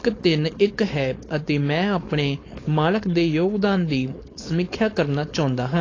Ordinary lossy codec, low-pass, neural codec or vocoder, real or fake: AAC, 32 kbps; 7.2 kHz; codec, 16 kHz, 4.8 kbps, FACodec; fake